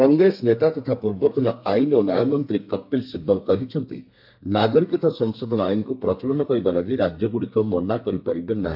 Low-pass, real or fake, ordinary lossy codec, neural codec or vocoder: 5.4 kHz; fake; MP3, 48 kbps; codec, 32 kHz, 1.9 kbps, SNAC